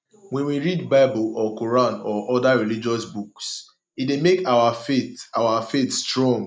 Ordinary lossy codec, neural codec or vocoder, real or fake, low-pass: none; none; real; none